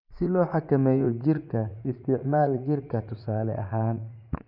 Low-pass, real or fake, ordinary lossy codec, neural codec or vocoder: 5.4 kHz; fake; MP3, 48 kbps; vocoder, 44.1 kHz, 80 mel bands, Vocos